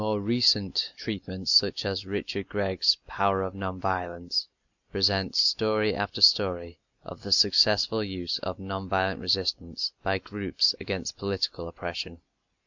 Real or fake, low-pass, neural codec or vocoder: real; 7.2 kHz; none